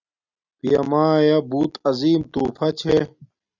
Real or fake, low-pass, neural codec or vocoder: real; 7.2 kHz; none